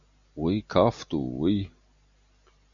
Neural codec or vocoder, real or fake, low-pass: none; real; 7.2 kHz